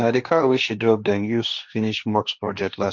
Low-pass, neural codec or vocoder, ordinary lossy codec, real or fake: 7.2 kHz; codec, 16 kHz, 1.1 kbps, Voila-Tokenizer; none; fake